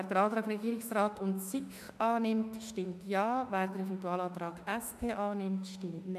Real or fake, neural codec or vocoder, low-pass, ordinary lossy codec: fake; autoencoder, 48 kHz, 32 numbers a frame, DAC-VAE, trained on Japanese speech; 14.4 kHz; none